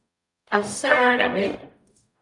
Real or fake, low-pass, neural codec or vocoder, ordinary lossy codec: fake; 10.8 kHz; codec, 44.1 kHz, 0.9 kbps, DAC; MP3, 96 kbps